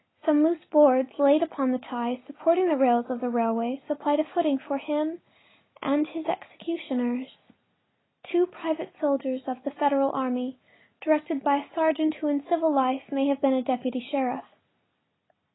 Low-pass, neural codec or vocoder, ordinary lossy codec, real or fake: 7.2 kHz; none; AAC, 16 kbps; real